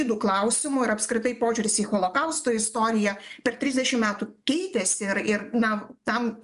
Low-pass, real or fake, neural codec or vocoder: 10.8 kHz; fake; vocoder, 24 kHz, 100 mel bands, Vocos